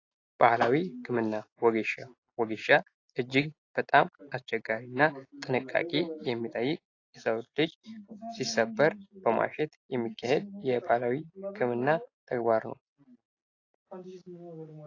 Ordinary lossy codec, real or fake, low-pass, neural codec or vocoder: AAC, 32 kbps; real; 7.2 kHz; none